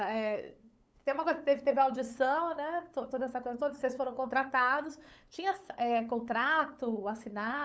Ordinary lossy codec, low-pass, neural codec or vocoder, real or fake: none; none; codec, 16 kHz, 8 kbps, FunCodec, trained on LibriTTS, 25 frames a second; fake